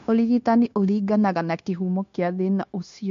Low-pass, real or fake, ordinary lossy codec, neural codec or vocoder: 7.2 kHz; fake; MP3, 48 kbps; codec, 16 kHz, 0.9 kbps, LongCat-Audio-Codec